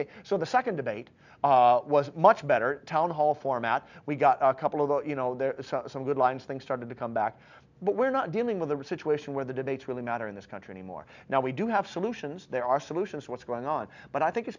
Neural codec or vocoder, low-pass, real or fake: none; 7.2 kHz; real